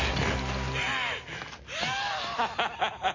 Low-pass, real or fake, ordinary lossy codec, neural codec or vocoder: 7.2 kHz; real; MP3, 32 kbps; none